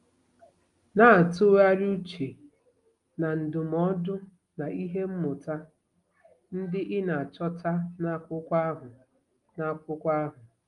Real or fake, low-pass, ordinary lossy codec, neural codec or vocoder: real; 10.8 kHz; Opus, 32 kbps; none